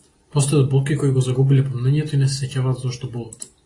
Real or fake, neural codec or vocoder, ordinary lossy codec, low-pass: real; none; AAC, 32 kbps; 10.8 kHz